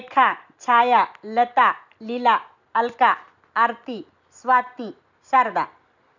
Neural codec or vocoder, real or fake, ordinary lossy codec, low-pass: none; real; none; 7.2 kHz